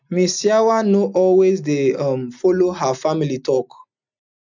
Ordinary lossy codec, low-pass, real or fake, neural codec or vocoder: none; 7.2 kHz; real; none